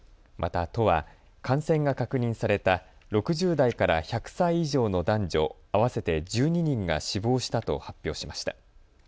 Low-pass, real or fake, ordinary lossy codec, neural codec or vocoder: none; real; none; none